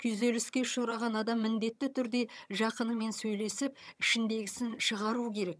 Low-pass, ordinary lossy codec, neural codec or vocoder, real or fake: none; none; vocoder, 22.05 kHz, 80 mel bands, HiFi-GAN; fake